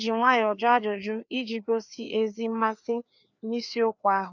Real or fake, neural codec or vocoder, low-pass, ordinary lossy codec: fake; codec, 16 kHz, 2 kbps, FreqCodec, larger model; 7.2 kHz; none